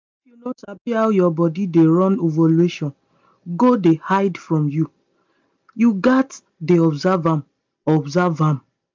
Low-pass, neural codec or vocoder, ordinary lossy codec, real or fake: 7.2 kHz; none; MP3, 64 kbps; real